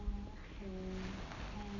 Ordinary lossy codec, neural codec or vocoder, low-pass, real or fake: none; none; 7.2 kHz; real